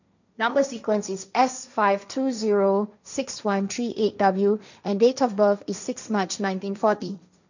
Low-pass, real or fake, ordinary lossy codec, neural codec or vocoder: 7.2 kHz; fake; none; codec, 16 kHz, 1.1 kbps, Voila-Tokenizer